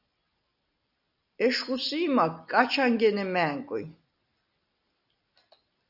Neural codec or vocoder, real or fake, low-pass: none; real; 5.4 kHz